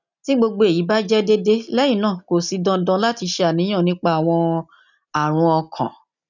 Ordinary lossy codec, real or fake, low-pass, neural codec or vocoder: none; real; 7.2 kHz; none